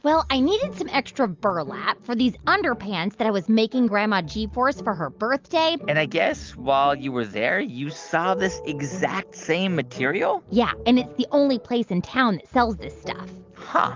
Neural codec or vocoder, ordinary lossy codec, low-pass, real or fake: none; Opus, 32 kbps; 7.2 kHz; real